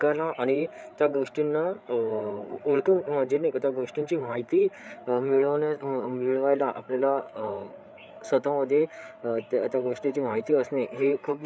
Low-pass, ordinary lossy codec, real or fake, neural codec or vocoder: none; none; fake; codec, 16 kHz, 8 kbps, FreqCodec, larger model